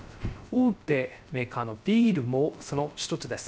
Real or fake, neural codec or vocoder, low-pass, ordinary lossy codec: fake; codec, 16 kHz, 0.3 kbps, FocalCodec; none; none